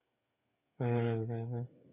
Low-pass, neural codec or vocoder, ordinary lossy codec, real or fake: 3.6 kHz; codec, 16 kHz, 8 kbps, FreqCodec, smaller model; AAC, 32 kbps; fake